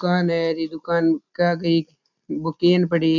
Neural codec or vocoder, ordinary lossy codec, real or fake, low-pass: none; none; real; 7.2 kHz